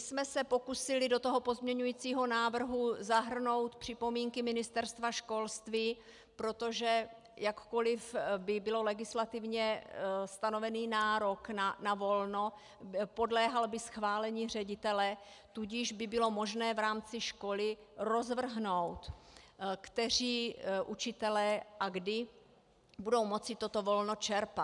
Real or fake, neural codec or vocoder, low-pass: real; none; 10.8 kHz